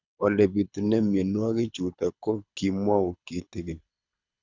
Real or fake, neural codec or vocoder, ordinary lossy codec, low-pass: fake; codec, 24 kHz, 6 kbps, HILCodec; none; 7.2 kHz